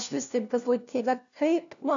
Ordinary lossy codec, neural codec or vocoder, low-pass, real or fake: MP3, 96 kbps; codec, 16 kHz, 1 kbps, FunCodec, trained on LibriTTS, 50 frames a second; 7.2 kHz; fake